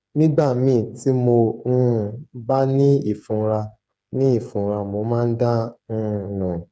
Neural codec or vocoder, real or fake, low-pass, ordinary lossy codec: codec, 16 kHz, 8 kbps, FreqCodec, smaller model; fake; none; none